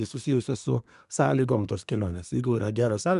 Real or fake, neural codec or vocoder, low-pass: fake; codec, 24 kHz, 1 kbps, SNAC; 10.8 kHz